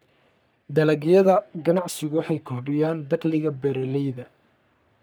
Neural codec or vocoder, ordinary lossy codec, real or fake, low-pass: codec, 44.1 kHz, 3.4 kbps, Pupu-Codec; none; fake; none